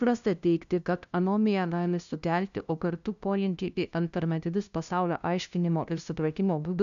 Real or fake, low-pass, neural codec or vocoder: fake; 7.2 kHz; codec, 16 kHz, 0.5 kbps, FunCodec, trained on LibriTTS, 25 frames a second